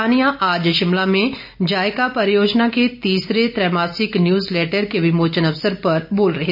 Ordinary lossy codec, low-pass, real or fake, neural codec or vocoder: none; 5.4 kHz; real; none